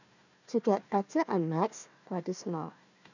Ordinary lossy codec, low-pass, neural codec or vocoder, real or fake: none; 7.2 kHz; codec, 16 kHz, 1 kbps, FunCodec, trained on Chinese and English, 50 frames a second; fake